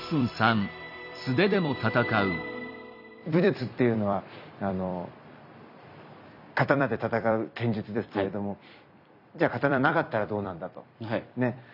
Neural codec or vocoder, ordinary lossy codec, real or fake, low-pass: vocoder, 44.1 kHz, 128 mel bands every 256 samples, BigVGAN v2; none; fake; 5.4 kHz